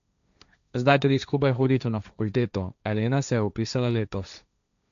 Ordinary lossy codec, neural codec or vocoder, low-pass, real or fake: AAC, 96 kbps; codec, 16 kHz, 1.1 kbps, Voila-Tokenizer; 7.2 kHz; fake